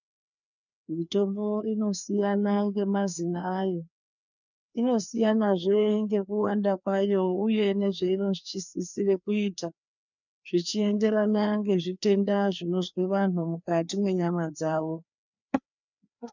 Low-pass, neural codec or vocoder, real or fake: 7.2 kHz; codec, 16 kHz, 2 kbps, FreqCodec, larger model; fake